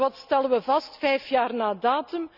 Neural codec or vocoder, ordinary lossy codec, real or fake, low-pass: none; none; real; 5.4 kHz